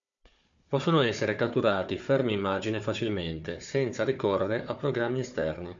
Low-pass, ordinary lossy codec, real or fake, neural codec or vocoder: 7.2 kHz; MP3, 64 kbps; fake; codec, 16 kHz, 4 kbps, FunCodec, trained on Chinese and English, 50 frames a second